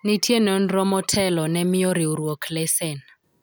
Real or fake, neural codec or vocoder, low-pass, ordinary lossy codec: real; none; none; none